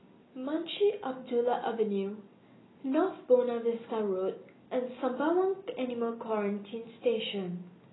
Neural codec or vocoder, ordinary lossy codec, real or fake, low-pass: none; AAC, 16 kbps; real; 7.2 kHz